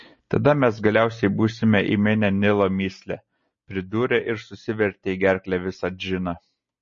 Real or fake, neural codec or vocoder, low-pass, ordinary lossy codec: real; none; 7.2 kHz; MP3, 32 kbps